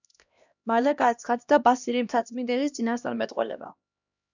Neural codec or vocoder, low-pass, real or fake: codec, 16 kHz, 1 kbps, X-Codec, HuBERT features, trained on LibriSpeech; 7.2 kHz; fake